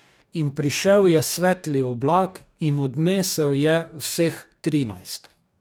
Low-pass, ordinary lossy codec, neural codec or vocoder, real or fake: none; none; codec, 44.1 kHz, 2.6 kbps, DAC; fake